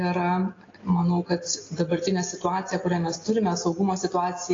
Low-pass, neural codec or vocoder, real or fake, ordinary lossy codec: 7.2 kHz; none; real; AAC, 32 kbps